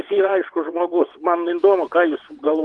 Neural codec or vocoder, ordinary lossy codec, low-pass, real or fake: vocoder, 22.05 kHz, 80 mel bands, WaveNeXt; Opus, 24 kbps; 9.9 kHz; fake